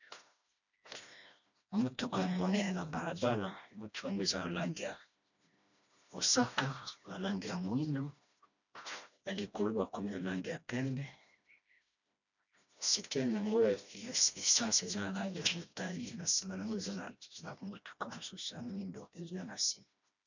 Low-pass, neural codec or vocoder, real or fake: 7.2 kHz; codec, 16 kHz, 1 kbps, FreqCodec, smaller model; fake